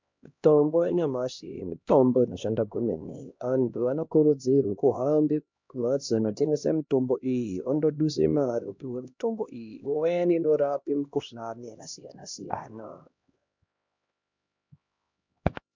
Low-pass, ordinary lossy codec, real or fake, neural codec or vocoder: 7.2 kHz; MP3, 64 kbps; fake; codec, 16 kHz, 1 kbps, X-Codec, HuBERT features, trained on LibriSpeech